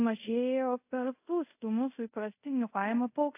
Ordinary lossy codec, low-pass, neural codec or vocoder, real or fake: AAC, 24 kbps; 3.6 kHz; codec, 24 kHz, 0.5 kbps, DualCodec; fake